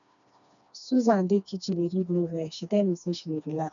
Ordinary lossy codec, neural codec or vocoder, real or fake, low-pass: none; codec, 16 kHz, 2 kbps, FreqCodec, smaller model; fake; 7.2 kHz